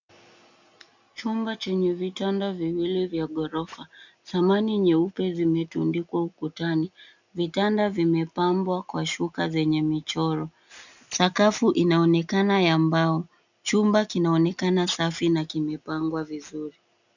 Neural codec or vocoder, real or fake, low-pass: none; real; 7.2 kHz